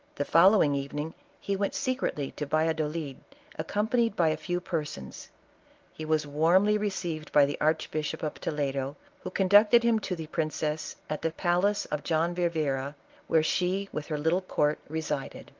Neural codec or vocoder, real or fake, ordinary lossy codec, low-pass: none; real; Opus, 24 kbps; 7.2 kHz